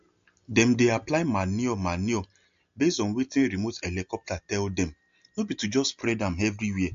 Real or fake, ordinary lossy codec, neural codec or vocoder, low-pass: real; MP3, 48 kbps; none; 7.2 kHz